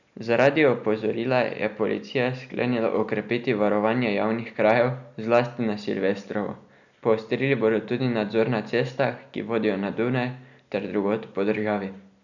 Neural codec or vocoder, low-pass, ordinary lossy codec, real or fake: none; 7.2 kHz; none; real